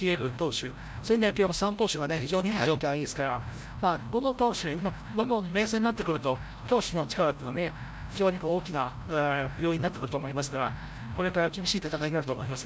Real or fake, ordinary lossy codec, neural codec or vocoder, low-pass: fake; none; codec, 16 kHz, 0.5 kbps, FreqCodec, larger model; none